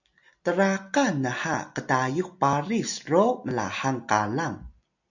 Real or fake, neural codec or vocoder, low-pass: real; none; 7.2 kHz